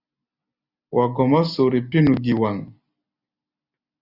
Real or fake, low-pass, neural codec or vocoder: real; 5.4 kHz; none